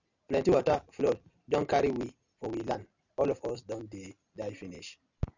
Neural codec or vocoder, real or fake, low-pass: none; real; 7.2 kHz